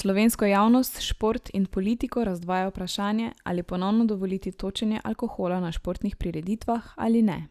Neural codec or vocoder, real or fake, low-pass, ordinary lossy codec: none; real; 14.4 kHz; Opus, 64 kbps